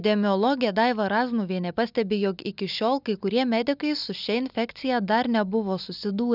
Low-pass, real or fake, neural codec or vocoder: 5.4 kHz; real; none